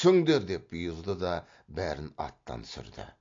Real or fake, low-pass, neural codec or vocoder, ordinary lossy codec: real; 7.2 kHz; none; AAC, 48 kbps